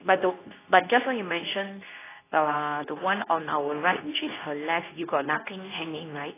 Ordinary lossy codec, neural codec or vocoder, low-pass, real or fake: AAC, 16 kbps; codec, 24 kHz, 0.9 kbps, WavTokenizer, medium speech release version 2; 3.6 kHz; fake